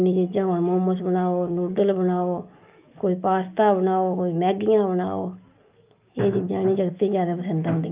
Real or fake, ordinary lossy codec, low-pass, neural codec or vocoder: fake; Opus, 24 kbps; 3.6 kHz; autoencoder, 48 kHz, 128 numbers a frame, DAC-VAE, trained on Japanese speech